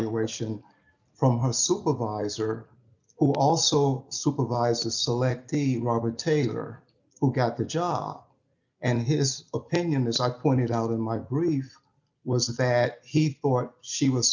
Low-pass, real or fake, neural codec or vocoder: 7.2 kHz; real; none